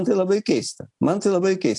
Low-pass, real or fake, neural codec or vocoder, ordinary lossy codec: 10.8 kHz; real; none; AAC, 64 kbps